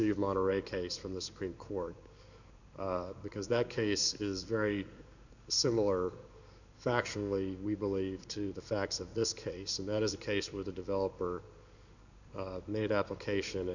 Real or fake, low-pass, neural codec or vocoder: fake; 7.2 kHz; codec, 16 kHz in and 24 kHz out, 1 kbps, XY-Tokenizer